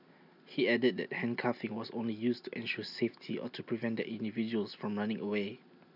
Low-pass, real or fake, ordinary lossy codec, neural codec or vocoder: 5.4 kHz; real; none; none